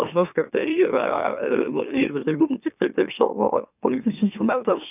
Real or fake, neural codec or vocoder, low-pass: fake; autoencoder, 44.1 kHz, a latent of 192 numbers a frame, MeloTTS; 3.6 kHz